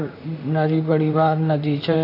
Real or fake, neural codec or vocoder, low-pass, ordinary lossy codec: fake; vocoder, 44.1 kHz, 128 mel bands, Pupu-Vocoder; 5.4 kHz; AAC, 32 kbps